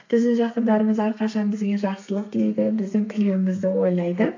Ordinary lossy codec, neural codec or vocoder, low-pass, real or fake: AAC, 48 kbps; codec, 32 kHz, 1.9 kbps, SNAC; 7.2 kHz; fake